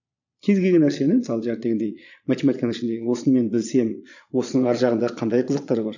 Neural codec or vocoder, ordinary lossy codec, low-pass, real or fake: vocoder, 22.05 kHz, 80 mel bands, Vocos; none; 7.2 kHz; fake